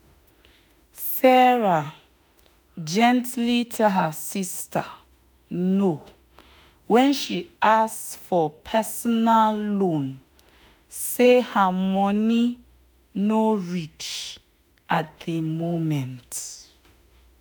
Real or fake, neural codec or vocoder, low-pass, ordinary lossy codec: fake; autoencoder, 48 kHz, 32 numbers a frame, DAC-VAE, trained on Japanese speech; none; none